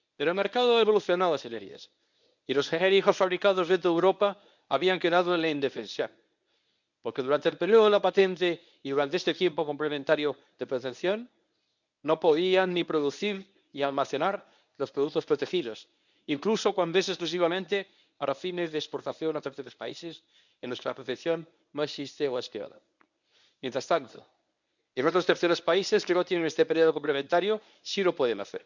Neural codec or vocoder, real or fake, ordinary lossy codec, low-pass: codec, 24 kHz, 0.9 kbps, WavTokenizer, medium speech release version 1; fake; none; 7.2 kHz